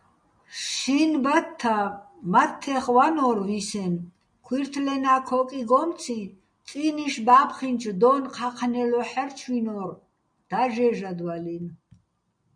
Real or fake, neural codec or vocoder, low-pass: real; none; 9.9 kHz